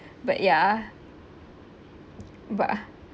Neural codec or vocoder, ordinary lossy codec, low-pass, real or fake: none; none; none; real